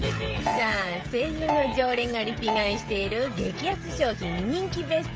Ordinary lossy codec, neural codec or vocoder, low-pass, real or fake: none; codec, 16 kHz, 16 kbps, FreqCodec, smaller model; none; fake